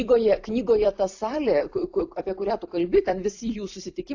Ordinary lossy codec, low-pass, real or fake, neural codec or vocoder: AAC, 48 kbps; 7.2 kHz; fake; vocoder, 44.1 kHz, 128 mel bands every 256 samples, BigVGAN v2